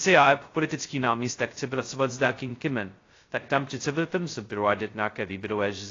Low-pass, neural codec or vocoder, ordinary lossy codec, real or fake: 7.2 kHz; codec, 16 kHz, 0.2 kbps, FocalCodec; AAC, 32 kbps; fake